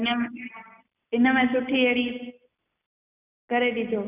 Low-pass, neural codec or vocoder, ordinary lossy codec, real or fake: 3.6 kHz; none; none; real